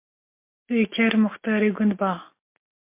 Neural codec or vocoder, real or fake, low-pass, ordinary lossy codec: none; real; 3.6 kHz; MP3, 24 kbps